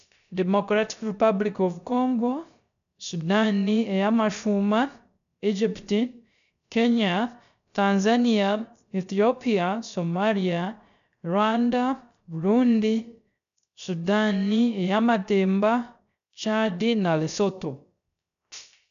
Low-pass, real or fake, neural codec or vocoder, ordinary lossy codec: 7.2 kHz; fake; codec, 16 kHz, 0.3 kbps, FocalCodec; none